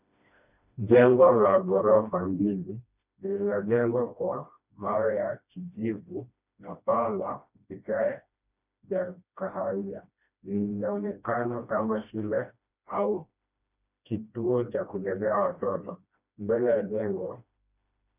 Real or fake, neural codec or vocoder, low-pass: fake; codec, 16 kHz, 1 kbps, FreqCodec, smaller model; 3.6 kHz